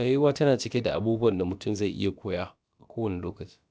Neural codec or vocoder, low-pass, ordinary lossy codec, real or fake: codec, 16 kHz, about 1 kbps, DyCAST, with the encoder's durations; none; none; fake